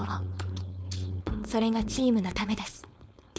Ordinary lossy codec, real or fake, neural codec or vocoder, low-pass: none; fake; codec, 16 kHz, 4.8 kbps, FACodec; none